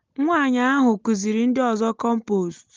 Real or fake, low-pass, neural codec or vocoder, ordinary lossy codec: real; 7.2 kHz; none; Opus, 32 kbps